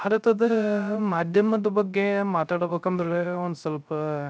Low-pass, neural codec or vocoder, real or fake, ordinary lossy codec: none; codec, 16 kHz, 0.3 kbps, FocalCodec; fake; none